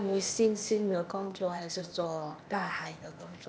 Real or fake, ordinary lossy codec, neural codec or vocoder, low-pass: fake; none; codec, 16 kHz, 0.8 kbps, ZipCodec; none